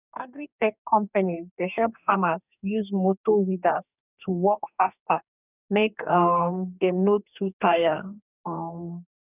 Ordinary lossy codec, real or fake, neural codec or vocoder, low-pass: none; fake; codec, 44.1 kHz, 2.6 kbps, DAC; 3.6 kHz